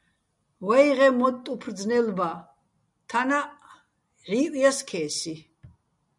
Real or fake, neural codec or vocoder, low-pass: real; none; 10.8 kHz